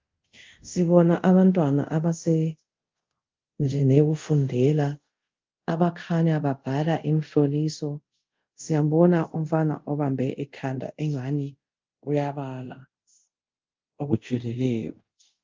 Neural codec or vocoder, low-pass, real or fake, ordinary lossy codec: codec, 24 kHz, 0.5 kbps, DualCodec; 7.2 kHz; fake; Opus, 32 kbps